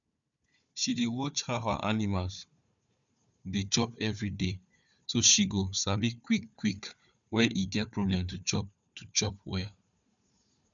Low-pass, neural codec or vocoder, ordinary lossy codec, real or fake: 7.2 kHz; codec, 16 kHz, 4 kbps, FunCodec, trained on Chinese and English, 50 frames a second; none; fake